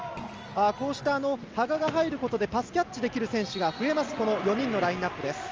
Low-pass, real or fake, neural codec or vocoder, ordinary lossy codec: 7.2 kHz; real; none; Opus, 24 kbps